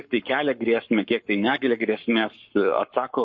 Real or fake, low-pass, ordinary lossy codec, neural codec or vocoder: fake; 7.2 kHz; MP3, 32 kbps; codec, 44.1 kHz, 7.8 kbps, Pupu-Codec